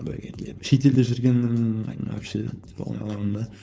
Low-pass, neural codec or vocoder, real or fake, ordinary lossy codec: none; codec, 16 kHz, 4.8 kbps, FACodec; fake; none